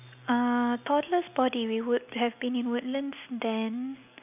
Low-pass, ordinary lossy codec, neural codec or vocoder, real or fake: 3.6 kHz; none; none; real